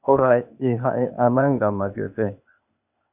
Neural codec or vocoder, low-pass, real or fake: codec, 16 kHz, 0.8 kbps, ZipCodec; 3.6 kHz; fake